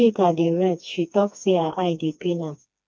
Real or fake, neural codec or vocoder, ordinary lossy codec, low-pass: fake; codec, 16 kHz, 2 kbps, FreqCodec, smaller model; none; none